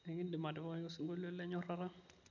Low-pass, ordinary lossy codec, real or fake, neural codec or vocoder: 7.2 kHz; none; real; none